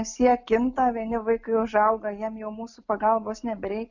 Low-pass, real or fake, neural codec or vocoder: 7.2 kHz; real; none